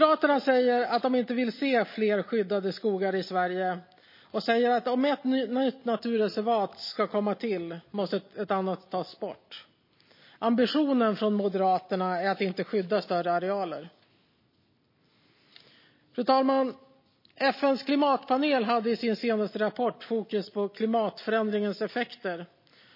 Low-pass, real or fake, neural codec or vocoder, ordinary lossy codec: 5.4 kHz; real; none; MP3, 24 kbps